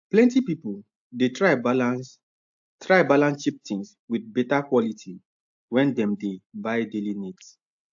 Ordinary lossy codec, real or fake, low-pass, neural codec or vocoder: AAC, 64 kbps; real; 7.2 kHz; none